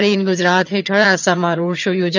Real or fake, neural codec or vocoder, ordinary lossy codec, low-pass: fake; vocoder, 22.05 kHz, 80 mel bands, HiFi-GAN; none; 7.2 kHz